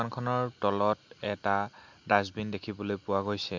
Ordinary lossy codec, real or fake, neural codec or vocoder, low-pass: MP3, 48 kbps; real; none; 7.2 kHz